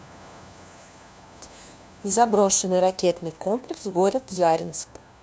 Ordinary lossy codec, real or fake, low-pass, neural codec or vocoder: none; fake; none; codec, 16 kHz, 1 kbps, FunCodec, trained on LibriTTS, 50 frames a second